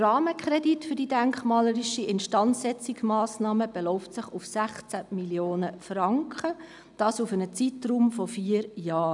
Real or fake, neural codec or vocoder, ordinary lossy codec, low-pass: real; none; none; 10.8 kHz